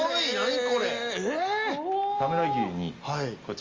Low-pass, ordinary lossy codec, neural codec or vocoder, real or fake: 7.2 kHz; Opus, 32 kbps; none; real